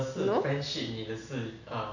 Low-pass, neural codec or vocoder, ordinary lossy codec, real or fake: 7.2 kHz; none; none; real